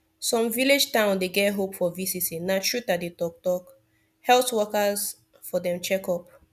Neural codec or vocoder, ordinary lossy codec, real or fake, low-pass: none; none; real; 14.4 kHz